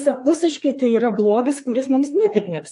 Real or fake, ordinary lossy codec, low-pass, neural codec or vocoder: fake; AAC, 64 kbps; 10.8 kHz; codec, 24 kHz, 1 kbps, SNAC